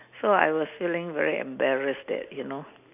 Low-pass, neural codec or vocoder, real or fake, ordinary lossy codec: 3.6 kHz; none; real; none